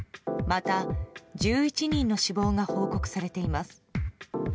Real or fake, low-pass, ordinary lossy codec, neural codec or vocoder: real; none; none; none